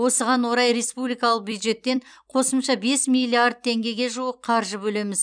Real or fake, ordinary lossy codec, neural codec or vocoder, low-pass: fake; none; vocoder, 44.1 kHz, 128 mel bands every 256 samples, BigVGAN v2; 9.9 kHz